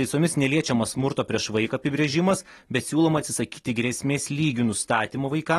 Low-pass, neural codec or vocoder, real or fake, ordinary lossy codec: 19.8 kHz; none; real; AAC, 32 kbps